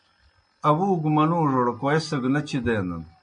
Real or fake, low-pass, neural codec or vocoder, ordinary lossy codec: real; 9.9 kHz; none; MP3, 48 kbps